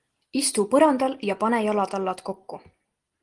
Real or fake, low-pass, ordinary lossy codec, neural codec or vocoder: real; 10.8 kHz; Opus, 24 kbps; none